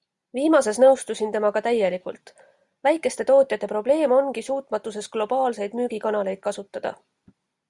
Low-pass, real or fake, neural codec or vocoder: 10.8 kHz; real; none